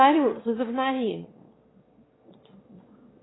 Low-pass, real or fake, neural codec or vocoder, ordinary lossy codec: 7.2 kHz; fake; autoencoder, 22.05 kHz, a latent of 192 numbers a frame, VITS, trained on one speaker; AAC, 16 kbps